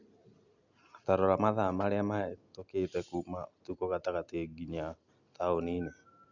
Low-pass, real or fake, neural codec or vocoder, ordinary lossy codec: 7.2 kHz; real; none; none